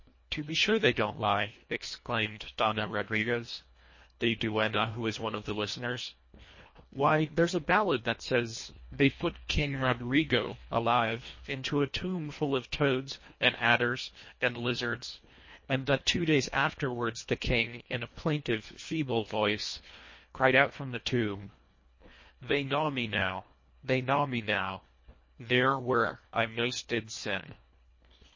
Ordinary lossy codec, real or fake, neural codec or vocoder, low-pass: MP3, 32 kbps; fake; codec, 24 kHz, 1.5 kbps, HILCodec; 7.2 kHz